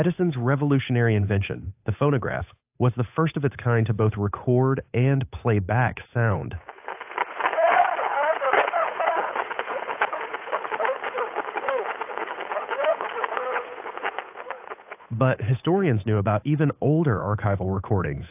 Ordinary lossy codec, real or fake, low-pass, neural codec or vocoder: AAC, 32 kbps; fake; 3.6 kHz; codec, 16 kHz, 8 kbps, FunCodec, trained on Chinese and English, 25 frames a second